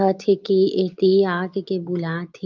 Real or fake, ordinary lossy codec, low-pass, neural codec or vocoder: real; Opus, 24 kbps; 7.2 kHz; none